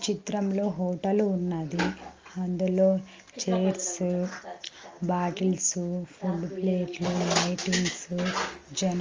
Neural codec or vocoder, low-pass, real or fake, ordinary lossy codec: none; 7.2 kHz; real; Opus, 32 kbps